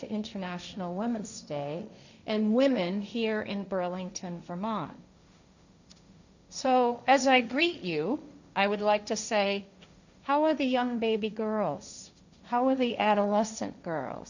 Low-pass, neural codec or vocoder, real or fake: 7.2 kHz; codec, 16 kHz, 1.1 kbps, Voila-Tokenizer; fake